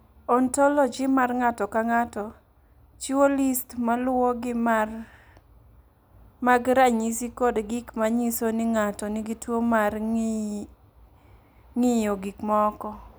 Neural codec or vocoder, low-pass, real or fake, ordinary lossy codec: none; none; real; none